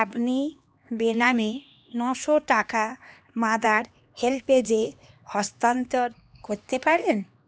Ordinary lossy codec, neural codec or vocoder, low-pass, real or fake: none; codec, 16 kHz, 2 kbps, X-Codec, HuBERT features, trained on LibriSpeech; none; fake